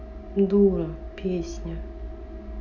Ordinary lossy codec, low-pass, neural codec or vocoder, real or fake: none; 7.2 kHz; none; real